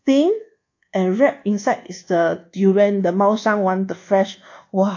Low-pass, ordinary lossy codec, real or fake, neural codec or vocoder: 7.2 kHz; AAC, 48 kbps; fake; codec, 24 kHz, 1.2 kbps, DualCodec